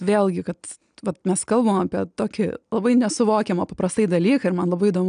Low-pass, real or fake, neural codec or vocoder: 9.9 kHz; real; none